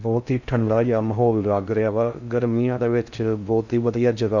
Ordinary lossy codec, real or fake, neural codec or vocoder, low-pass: none; fake; codec, 16 kHz in and 24 kHz out, 0.6 kbps, FocalCodec, streaming, 4096 codes; 7.2 kHz